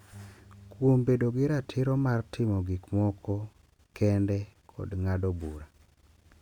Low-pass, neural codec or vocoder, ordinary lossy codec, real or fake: 19.8 kHz; none; none; real